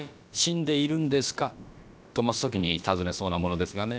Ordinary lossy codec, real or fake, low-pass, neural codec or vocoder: none; fake; none; codec, 16 kHz, about 1 kbps, DyCAST, with the encoder's durations